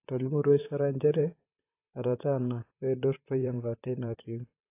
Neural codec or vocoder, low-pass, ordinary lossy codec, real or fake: vocoder, 44.1 kHz, 128 mel bands, Pupu-Vocoder; 3.6 kHz; AAC, 24 kbps; fake